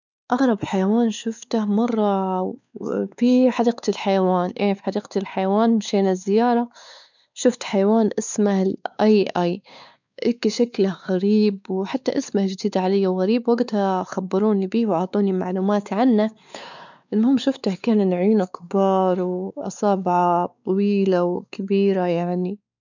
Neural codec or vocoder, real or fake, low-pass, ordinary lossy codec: codec, 16 kHz, 4 kbps, X-Codec, WavLM features, trained on Multilingual LibriSpeech; fake; 7.2 kHz; none